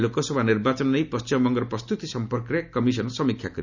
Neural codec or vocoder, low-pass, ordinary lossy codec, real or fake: none; 7.2 kHz; none; real